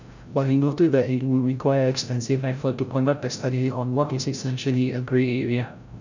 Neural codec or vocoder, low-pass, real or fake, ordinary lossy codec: codec, 16 kHz, 0.5 kbps, FreqCodec, larger model; 7.2 kHz; fake; none